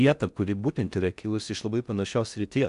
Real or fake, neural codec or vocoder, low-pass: fake; codec, 16 kHz in and 24 kHz out, 0.6 kbps, FocalCodec, streaming, 4096 codes; 10.8 kHz